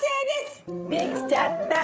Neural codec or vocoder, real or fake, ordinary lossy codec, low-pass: codec, 16 kHz, 8 kbps, FreqCodec, larger model; fake; none; none